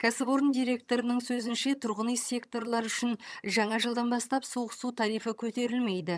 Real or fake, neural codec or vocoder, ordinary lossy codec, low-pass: fake; vocoder, 22.05 kHz, 80 mel bands, HiFi-GAN; none; none